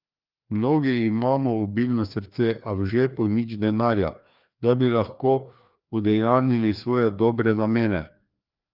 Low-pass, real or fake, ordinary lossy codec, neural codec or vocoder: 5.4 kHz; fake; Opus, 24 kbps; codec, 16 kHz, 2 kbps, FreqCodec, larger model